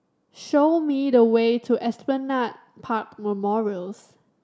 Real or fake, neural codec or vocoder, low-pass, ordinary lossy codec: real; none; none; none